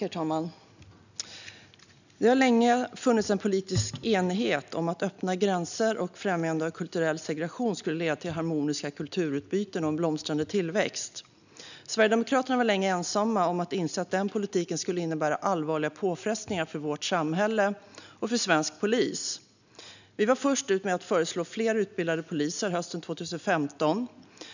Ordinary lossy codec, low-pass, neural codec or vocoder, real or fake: none; 7.2 kHz; none; real